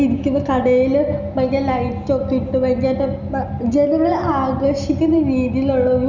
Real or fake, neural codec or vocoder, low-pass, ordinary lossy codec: real; none; 7.2 kHz; none